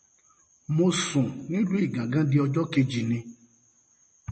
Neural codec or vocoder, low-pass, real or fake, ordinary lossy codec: none; 10.8 kHz; real; MP3, 32 kbps